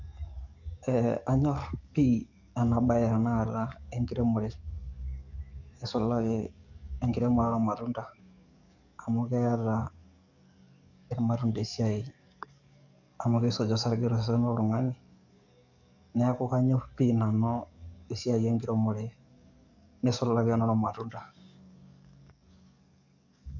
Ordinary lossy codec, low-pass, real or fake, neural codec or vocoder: none; 7.2 kHz; fake; codec, 44.1 kHz, 7.8 kbps, DAC